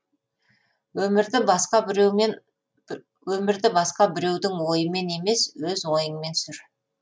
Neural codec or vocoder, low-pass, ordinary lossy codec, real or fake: none; none; none; real